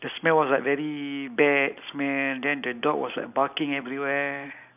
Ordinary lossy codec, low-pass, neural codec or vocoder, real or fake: none; 3.6 kHz; none; real